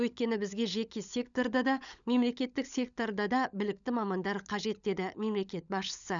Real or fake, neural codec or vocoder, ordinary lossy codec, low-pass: fake; codec, 16 kHz, 16 kbps, FunCodec, trained on LibriTTS, 50 frames a second; none; 7.2 kHz